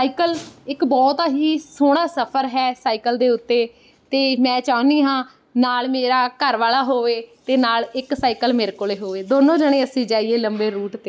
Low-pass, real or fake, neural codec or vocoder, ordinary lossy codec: none; real; none; none